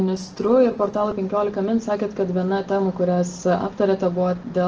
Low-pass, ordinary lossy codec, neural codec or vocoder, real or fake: 7.2 kHz; Opus, 16 kbps; none; real